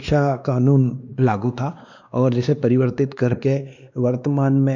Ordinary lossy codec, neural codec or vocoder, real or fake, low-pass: none; codec, 16 kHz, 2 kbps, X-Codec, WavLM features, trained on Multilingual LibriSpeech; fake; 7.2 kHz